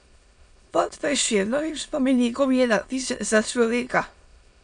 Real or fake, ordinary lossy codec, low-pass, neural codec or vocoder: fake; none; 9.9 kHz; autoencoder, 22.05 kHz, a latent of 192 numbers a frame, VITS, trained on many speakers